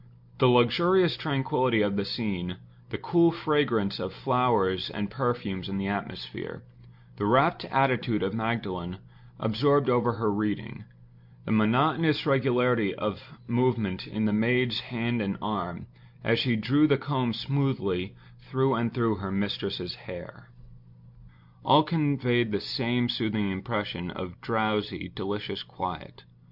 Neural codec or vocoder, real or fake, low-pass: none; real; 5.4 kHz